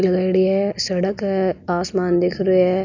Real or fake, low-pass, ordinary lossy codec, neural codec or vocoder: real; 7.2 kHz; none; none